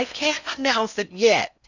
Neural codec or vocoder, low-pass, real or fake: codec, 16 kHz in and 24 kHz out, 0.6 kbps, FocalCodec, streaming, 4096 codes; 7.2 kHz; fake